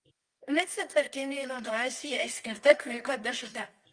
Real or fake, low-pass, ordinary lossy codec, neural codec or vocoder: fake; 9.9 kHz; Opus, 32 kbps; codec, 24 kHz, 0.9 kbps, WavTokenizer, medium music audio release